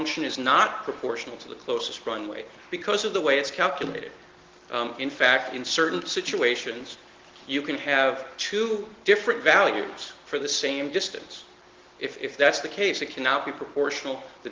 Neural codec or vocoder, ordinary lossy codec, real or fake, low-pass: none; Opus, 16 kbps; real; 7.2 kHz